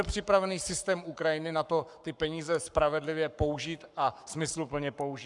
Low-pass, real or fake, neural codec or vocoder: 10.8 kHz; fake; codec, 44.1 kHz, 7.8 kbps, Pupu-Codec